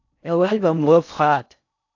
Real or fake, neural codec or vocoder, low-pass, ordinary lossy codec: fake; codec, 16 kHz in and 24 kHz out, 0.6 kbps, FocalCodec, streaming, 4096 codes; 7.2 kHz; AAC, 48 kbps